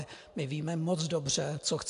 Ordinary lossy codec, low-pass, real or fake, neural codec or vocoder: AAC, 64 kbps; 10.8 kHz; real; none